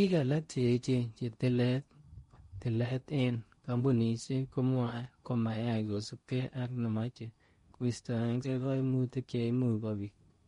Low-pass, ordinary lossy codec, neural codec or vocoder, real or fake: 10.8 kHz; MP3, 48 kbps; codec, 16 kHz in and 24 kHz out, 0.8 kbps, FocalCodec, streaming, 65536 codes; fake